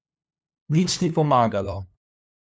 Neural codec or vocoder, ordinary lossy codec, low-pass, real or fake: codec, 16 kHz, 2 kbps, FunCodec, trained on LibriTTS, 25 frames a second; none; none; fake